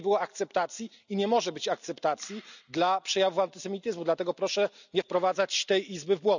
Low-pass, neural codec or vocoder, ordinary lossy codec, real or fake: 7.2 kHz; none; none; real